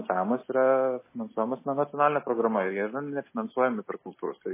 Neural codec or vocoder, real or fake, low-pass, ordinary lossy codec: none; real; 3.6 kHz; MP3, 16 kbps